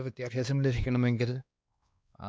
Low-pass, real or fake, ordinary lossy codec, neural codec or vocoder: none; fake; none; codec, 16 kHz, 2 kbps, X-Codec, WavLM features, trained on Multilingual LibriSpeech